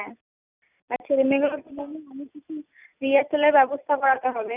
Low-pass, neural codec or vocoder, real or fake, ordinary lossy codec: 3.6 kHz; none; real; none